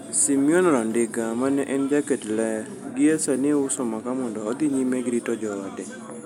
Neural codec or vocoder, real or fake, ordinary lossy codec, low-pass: none; real; none; 19.8 kHz